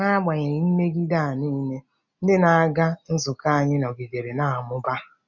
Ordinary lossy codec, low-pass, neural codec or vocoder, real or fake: none; 7.2 kHz; none; real